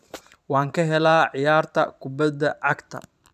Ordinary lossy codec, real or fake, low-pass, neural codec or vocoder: none; fake; 14.4 kHz; vocoder, 44.1 kHz, 128 mel bands every 512 samples, BigVGAN v2